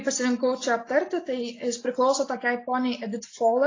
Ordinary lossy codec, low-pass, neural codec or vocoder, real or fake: AAC, 32 kbps; 7.2 kHz; none; real